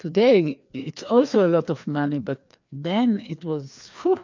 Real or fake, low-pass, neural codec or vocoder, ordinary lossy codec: fake; 7.2 kHz; codec, 16 kHz, 2 kbps, FreqCodec, larger model; MP3, 64 kbps